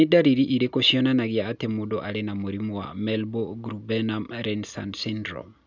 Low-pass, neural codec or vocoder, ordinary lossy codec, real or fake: 7.2 kHz; none; none; real